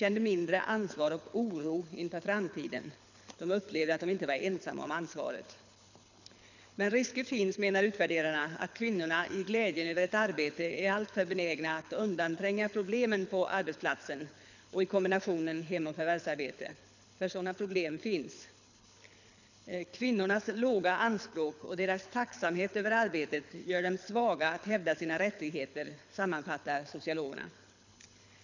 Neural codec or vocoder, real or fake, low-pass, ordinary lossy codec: codec, 24 kHz, 6 kbps, HILCodec; fake; 7.2 kHz; none